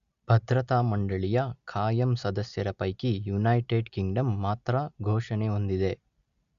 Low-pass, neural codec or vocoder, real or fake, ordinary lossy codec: 7.2 kHz; none; real; Opus, 64 kbps